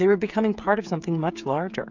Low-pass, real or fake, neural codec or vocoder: 7.2 kHz; fake; codec, 16 kHz, 8 kbps, FreqCodec, smaller model